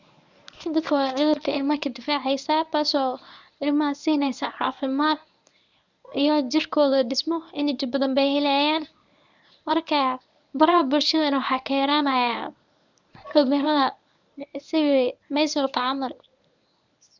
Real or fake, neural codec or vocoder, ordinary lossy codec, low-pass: fake; codec, 24 kHz, 0.9 kbps, WavTokenizer, medium speech release version 1; none; 7.2 kHz